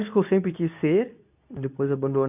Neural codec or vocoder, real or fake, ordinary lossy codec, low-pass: codec, 24 kHz, 0.9 kbps, WavTokenizer, medium speech release version 2; fake; AAC, 32 kbps; 3.6 kHz